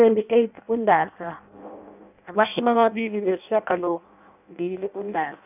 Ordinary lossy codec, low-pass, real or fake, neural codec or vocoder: none; 3.6 kHz; fake; codec, 16 kHz in and 24 kHz out, 0.6 kbps, FireRedTTS-2 codec